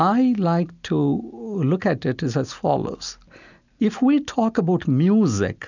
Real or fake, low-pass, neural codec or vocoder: real; 7.2 kHz; none